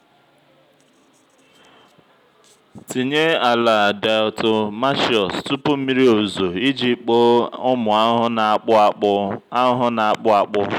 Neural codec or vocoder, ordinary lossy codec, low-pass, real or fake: none; none; 19.8 kHz; real